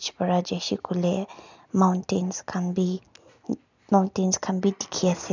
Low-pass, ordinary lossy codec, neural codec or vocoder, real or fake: 7.2 kHz; none; none; real